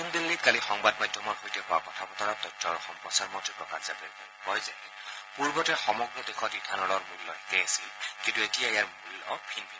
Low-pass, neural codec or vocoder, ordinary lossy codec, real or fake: none; none; none; real